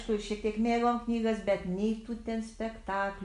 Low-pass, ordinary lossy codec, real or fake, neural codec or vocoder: 10.8 kHz; MP3, 96 kbps; real; none